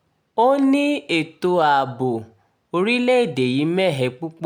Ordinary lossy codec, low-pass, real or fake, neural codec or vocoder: none; 19.8 kHz; real; none